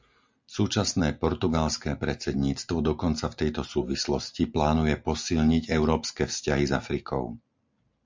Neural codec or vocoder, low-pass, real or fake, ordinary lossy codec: none; 7.2 kHz; real; MP3, 64 kbps